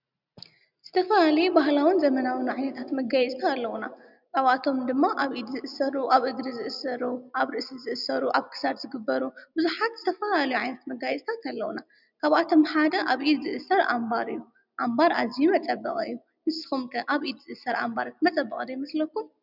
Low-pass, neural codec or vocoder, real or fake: 5.4 kHz; none; real